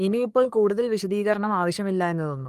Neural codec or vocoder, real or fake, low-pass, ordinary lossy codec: codec, 44.1 kHz, 3.4 kbps, Pupu-Codec; fake; 14.4 kHz; Opus, 32 kbps